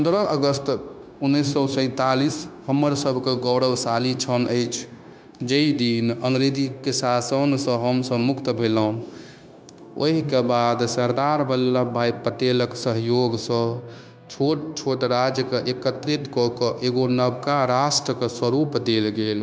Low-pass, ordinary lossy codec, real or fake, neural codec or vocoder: none; none; fake; codec, 16 kHz, 0.9 kbps, LongCat-Audio-Codec